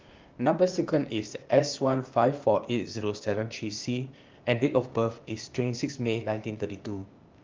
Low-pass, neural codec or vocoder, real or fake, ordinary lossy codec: 7.2 kHz; codec, 16 kHz, 0.8 kbps, ZipCodec; fake; Opus, 24 kbps